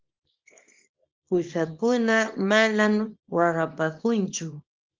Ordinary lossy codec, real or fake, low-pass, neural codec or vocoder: Opus, 32 kbps; fake; 7.2 kHz; codec, 24 kHz, 0.9 kbps, WavTokenizer, small release